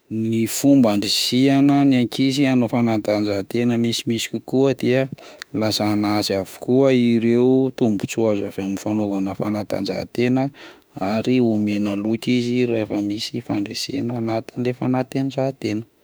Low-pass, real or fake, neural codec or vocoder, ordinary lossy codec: none; fake; autoencoder, 48 kHz, 32 numbers a frame, DAC-VAE, trained on Japanese speech; none